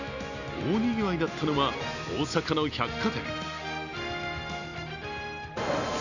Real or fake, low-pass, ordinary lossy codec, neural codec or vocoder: real; 7.2 kHz; none; none